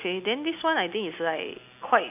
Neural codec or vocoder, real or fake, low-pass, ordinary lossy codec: none; real; 3.6 kHz; none